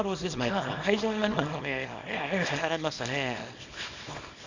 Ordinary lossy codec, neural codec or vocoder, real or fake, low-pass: Opus, 64 kbps; codec, 24 kHz, 0.9 kbps, WavTokenizer, small release; fake; 7.2 kHz